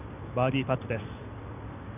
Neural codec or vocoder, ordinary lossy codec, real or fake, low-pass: none; none; real; 3.6 kHz